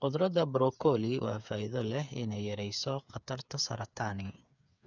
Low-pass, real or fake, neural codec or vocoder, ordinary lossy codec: 7.2 kHz; fake; codec, 16 kHz, 8 kbps, FreqCodec, smaller model; none